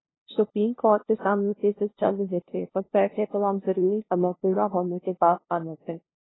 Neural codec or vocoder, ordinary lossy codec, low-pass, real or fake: codec, 16 kHz, 0.5 kbps, FunCodec, trained on LibriTTS, 25 frames a second; AAC, 16 kbps; 7.2 kHz; fake